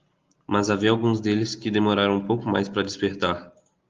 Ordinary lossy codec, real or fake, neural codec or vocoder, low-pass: Opus, 32 kbps; real; none; 7.2 kHz